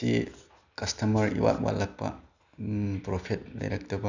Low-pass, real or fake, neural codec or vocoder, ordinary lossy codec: 7.2 kHz; real; none; none